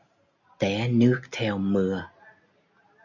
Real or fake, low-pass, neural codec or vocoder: real; 7.2 kHz; none